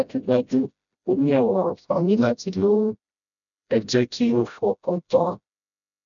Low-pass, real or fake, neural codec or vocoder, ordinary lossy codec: 7.2 kHz; fake; codec, 16 kHz, 0.5 kbps, FreqCodec, smaller model; none